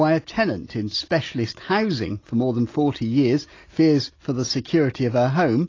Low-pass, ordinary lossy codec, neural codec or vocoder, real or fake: 7.2 kHz; AAC, 32 kbps; none; real